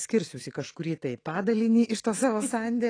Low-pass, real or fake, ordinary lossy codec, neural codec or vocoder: 9.9 kHz; fake; AAC, 32 kbps; autoencoder, 48 kHz, 128 numbers a frame, DAC-VAE, trained on Japanese speech